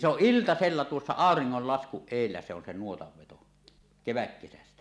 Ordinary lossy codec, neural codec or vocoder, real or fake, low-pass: none; none; real; none